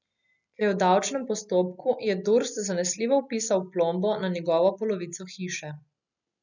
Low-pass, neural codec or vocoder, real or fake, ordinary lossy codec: 7.2 kHz; none; real; none